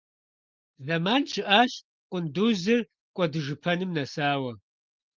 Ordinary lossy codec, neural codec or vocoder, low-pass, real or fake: Opus, 32 kbps; none; 7.2 kHz; real